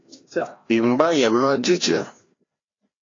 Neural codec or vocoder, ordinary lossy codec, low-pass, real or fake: codec, 16 kHz, 1 kbps, FreqCodec, larger model; AAC, 32 kbps; 7.2 kHz; fake